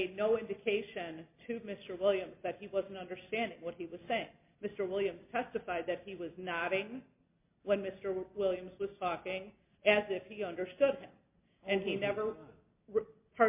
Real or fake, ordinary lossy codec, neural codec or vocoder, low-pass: real; MP3, 32 kbps; none; 3.6 kHz